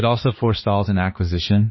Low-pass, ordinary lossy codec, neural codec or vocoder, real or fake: 7.2 kHz; MP3, 24 kbps; codec, 16 kHz, 2 kbps, X-Codec, HuBERT features, trained on balanced general audio; fake